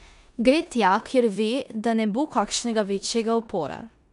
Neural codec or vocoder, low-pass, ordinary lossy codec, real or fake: codec, 16 kHz in and 24 kHz out, 0.9 kbps, LongCat-Audio-Codec, four codebook decoder; 10.8 kHz; none; fake